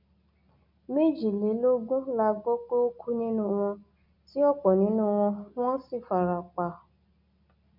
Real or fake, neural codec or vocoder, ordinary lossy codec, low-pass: real; none; none; 5.4 kHz